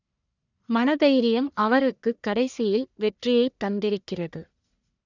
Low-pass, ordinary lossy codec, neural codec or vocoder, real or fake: 7.2 kHz; none; codec, 44.1 kHz, 1.7 kbps, Pupu-Codec; fake